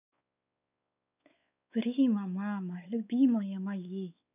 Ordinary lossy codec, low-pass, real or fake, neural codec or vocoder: none; 3.6 kHz; fake; codec, 16 kHz, 4 kbps, X-Codec, WavLM features, trained on Multilingual LibriSpeech